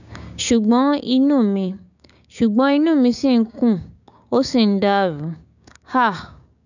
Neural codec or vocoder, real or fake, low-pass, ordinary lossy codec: codec, 16 kHz, 6 kbps, DAC; fake; 7.2 kHz; none